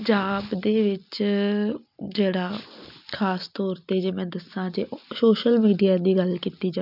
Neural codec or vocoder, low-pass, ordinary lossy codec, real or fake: none; 5.4 kHz; none; real